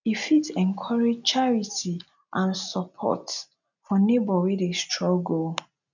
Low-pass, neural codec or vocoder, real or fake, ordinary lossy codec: 7.2 kHz; none; real; none